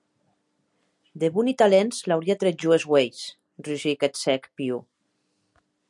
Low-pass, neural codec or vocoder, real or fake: 10.8 kHz; none; real